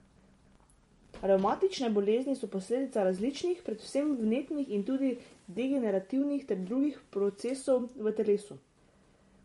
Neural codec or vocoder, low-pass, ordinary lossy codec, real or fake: none; 19.8 kHz; MP3, 48 kbps; real